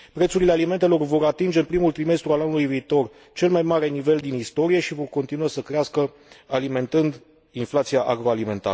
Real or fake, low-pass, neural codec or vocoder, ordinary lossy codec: real; none; none; none